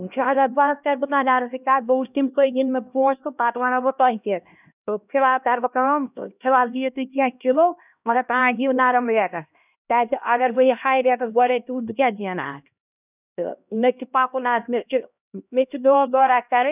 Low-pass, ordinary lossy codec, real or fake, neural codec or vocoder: 3.6 kHz; none; fake; codec, 16 kHz, 1 kbps, X-Codec, HuBERT features, trained on LibriSpeech